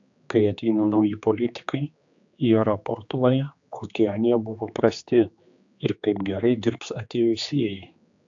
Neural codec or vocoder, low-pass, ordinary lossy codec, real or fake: codec, 16 kHz, 2 kbps, X-Codec, HuBERT features, trained on general audio; 7.2 kHz; AAC, 64 kbps; fake